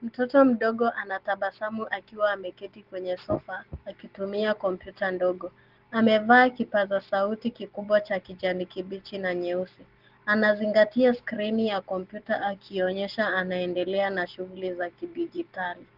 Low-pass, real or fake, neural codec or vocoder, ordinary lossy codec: 5.4 kHz; real; none; Opus, 16 kbps